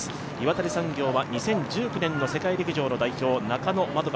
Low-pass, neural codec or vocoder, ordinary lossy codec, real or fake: none; none; none; real